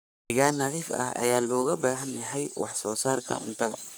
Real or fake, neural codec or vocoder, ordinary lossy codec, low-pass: fake; codec, 44.1 kHz, 3.4 kbps, Pupu-Codec; none; none